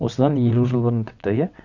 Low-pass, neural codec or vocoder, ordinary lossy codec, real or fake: 7.2 kHz; vocoder, 22.05 kHz, 80 mel bands, WaveNeXt; none; fake